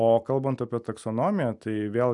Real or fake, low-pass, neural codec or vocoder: real; 10.8 kHz; none